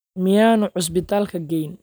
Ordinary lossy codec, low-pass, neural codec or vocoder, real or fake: none; none; none; real